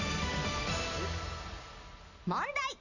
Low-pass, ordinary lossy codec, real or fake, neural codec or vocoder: 7.2 kHz; none; real; none